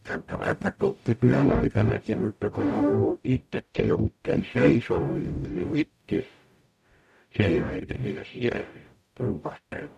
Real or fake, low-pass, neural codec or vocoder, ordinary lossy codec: fake; 14.4 kHz; codec, 44.1 kHz, 0.9 kbps, DAC; none